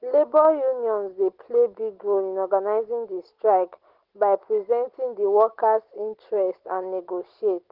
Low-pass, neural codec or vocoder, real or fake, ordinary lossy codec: 5.4 kHz; none; real; Opus, 16 kbps